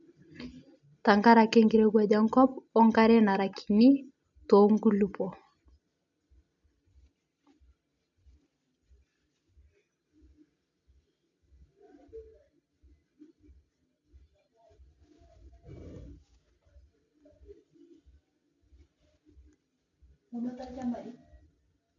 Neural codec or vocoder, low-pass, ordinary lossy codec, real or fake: none; 7.2 kHz; none; real